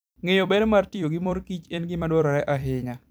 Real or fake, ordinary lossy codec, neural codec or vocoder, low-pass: fake; none; vocoder, 44.1 kHz, 128 mel bands every 256 samples, BigVGAN v2; none